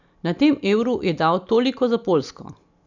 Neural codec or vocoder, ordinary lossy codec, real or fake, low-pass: none; none; real; 7.2 kHz